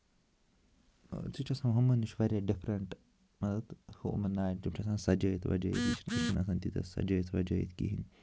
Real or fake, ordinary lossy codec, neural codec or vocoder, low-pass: real; none; none; none